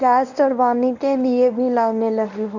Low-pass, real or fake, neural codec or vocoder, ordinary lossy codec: 7.2 kHz; fake; codec, 24 kHz, 0.9 kbps, WavTokenizer, medium speech release version 1; none